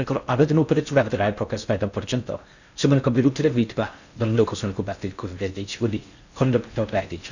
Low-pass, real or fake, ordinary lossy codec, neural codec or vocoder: 7.2 kHz; fake; none; codec, 16 kHz in and 24 kHz out, 0.6 kbps, FocalCodec, streaming, 4096 codes